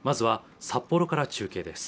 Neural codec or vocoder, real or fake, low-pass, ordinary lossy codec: none; real; none; none